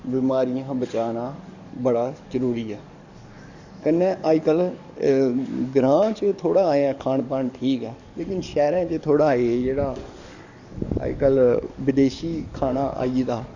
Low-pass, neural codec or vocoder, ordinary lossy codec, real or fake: 7.2 kHz; codec, 44.1 kHz, 7.8 kbps, DAC; Opus, 64 kbps; fake